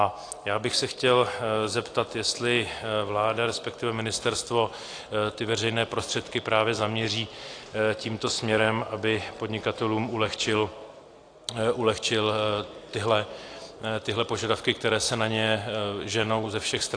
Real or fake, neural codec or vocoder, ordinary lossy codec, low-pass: real; none; AAC, 48 kbps; 9.9 kHz